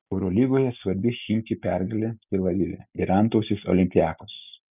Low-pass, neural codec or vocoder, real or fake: 3.6 kHz; none; real